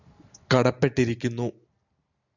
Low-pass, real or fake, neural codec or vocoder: 7.2 kHz; real; none